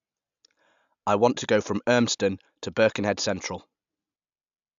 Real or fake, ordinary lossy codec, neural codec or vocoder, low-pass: real; AAC, 96 kbps; none; 7.2 kHz